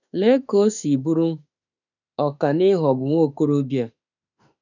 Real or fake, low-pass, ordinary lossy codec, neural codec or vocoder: fake; 7.2 kHz; none; autoencoder, 48 kHz, 32 numbers a frame, DAC-VAE, trained on Japanese speech